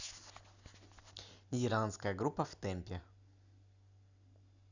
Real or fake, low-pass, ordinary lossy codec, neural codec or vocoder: real; 7.2 kHz; none; none